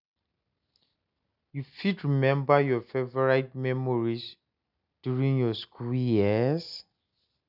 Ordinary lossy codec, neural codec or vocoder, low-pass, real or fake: none; none; 5.4 kHz; real